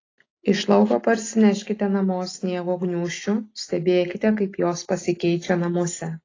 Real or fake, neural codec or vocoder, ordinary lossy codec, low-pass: real; none; AAC, 32 kbps; 7.2 kHz